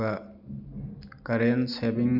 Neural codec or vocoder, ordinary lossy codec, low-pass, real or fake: none; none; 5.4 kHz; real